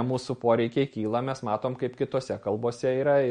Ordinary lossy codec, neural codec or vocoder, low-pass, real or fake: MP3, 48 kbps; none; 9.9 kHz; real